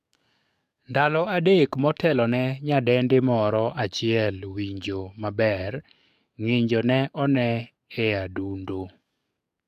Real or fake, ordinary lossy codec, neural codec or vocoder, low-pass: fake; none; codec, 44.1 kHz, 7.8 kbps, DAC; 14.4 kHz